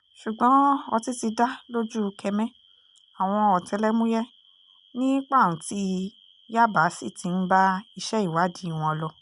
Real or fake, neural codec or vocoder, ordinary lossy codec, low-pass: real; none; none; 10.8 kHz